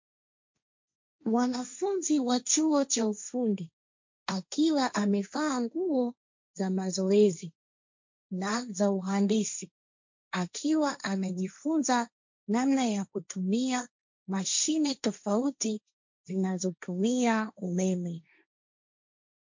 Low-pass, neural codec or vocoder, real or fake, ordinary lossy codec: 7.2 kHz; codec, 16 kHz, 1.1 kbps, Voila-Tokenizer; fake; MP3, 48 kbps